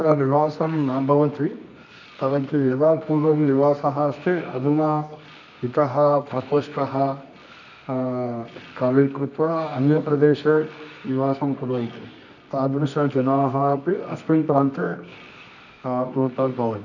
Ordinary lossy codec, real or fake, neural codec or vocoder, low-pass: none; fake; codec, 24 kHz, 0.9 kbps, WavTokenizer, medium music audio release; 7.2 kHz